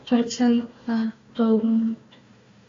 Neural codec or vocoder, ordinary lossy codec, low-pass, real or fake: codec, 16 kHz, 1 kbps, FunCodec, trained on Chinese and English, 50 frames a second; AAC, 48 kbps; 7.2 kHz; fake